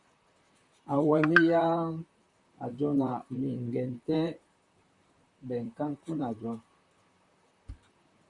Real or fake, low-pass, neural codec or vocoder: fake; 10.8 kHz; vocoder, 44.1 kHz, 128 mel bands, Pupu-Vocoder